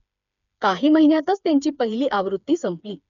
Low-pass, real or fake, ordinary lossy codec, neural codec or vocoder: 7.2 kHz; fake; MP3, 96 kbps; codec, 16 kHz, 4 kbps, FreqCodec, smaller model